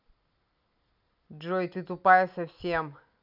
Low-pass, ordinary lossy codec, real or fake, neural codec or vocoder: 5.4 kHz; none; real; none